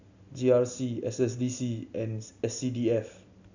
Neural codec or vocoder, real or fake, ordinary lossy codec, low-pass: none; real; none; 7.2 kHz